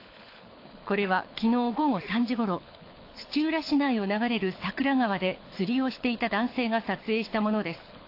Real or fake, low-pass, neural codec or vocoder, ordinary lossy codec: fake; 5.4 kHz; codec, 24 kHz, 6 kbps, HILCodec; AAC, 32 kbps